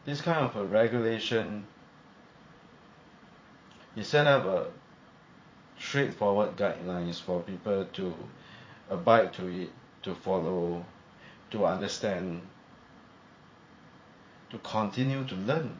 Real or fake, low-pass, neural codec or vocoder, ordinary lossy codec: fake; 7.2 kHz; vocoder, 44.1 kHz, 80 mel bands, Vocos; MP3, 32 kbps